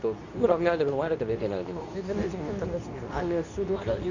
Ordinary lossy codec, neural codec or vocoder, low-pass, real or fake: none; codec, 24 kHz, 0.9 kbps, WavTokenizer, medium speech release version 2; 7.2 kHz; fake